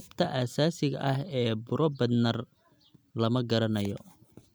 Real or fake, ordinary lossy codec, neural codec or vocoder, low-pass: real; none; none; none